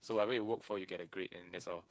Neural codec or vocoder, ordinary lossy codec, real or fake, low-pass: codec, 16 kHz, 8 kbps, FreqCodec, smaller model; none; fake; none